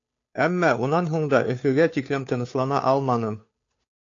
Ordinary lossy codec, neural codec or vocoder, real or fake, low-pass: AAC, 48 kbps; codec, 16 kHz, 2 kbps, FunCodec, trained on Chinese and English, 25 frames a second; fake; 7.2 kHz